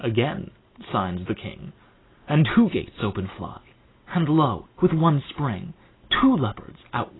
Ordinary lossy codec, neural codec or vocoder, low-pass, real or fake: AAC, 16 kbps; none; 7.2 kHz; real